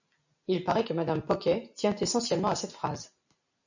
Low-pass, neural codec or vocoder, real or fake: 7.2 kHz; none; real